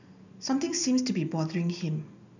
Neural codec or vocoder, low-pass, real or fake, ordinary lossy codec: none; 7.2 kHz; real; none